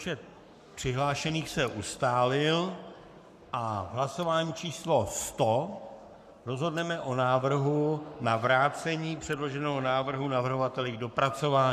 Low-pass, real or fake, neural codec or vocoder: 14.4 kHz; fake; codec, 44.1 kHz, 7.8 kbps, Pupu-Codec